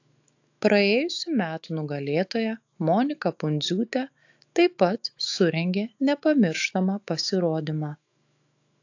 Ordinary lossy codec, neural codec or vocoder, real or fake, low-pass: AAC, 48 kbps; autoencoder, 48 kHz, 128 numbers a frame, DAC-VAE, trained on Japanese speech; fake; 7.2 kHz